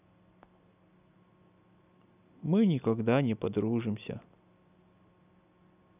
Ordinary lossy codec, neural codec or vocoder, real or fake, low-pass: none; none; real; 3.6 kHz